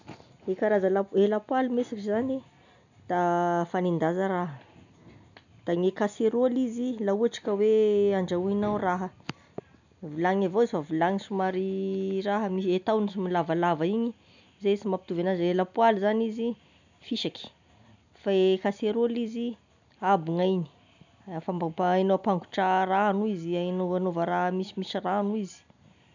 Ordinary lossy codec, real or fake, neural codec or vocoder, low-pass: none; real; none; 7.2 kHz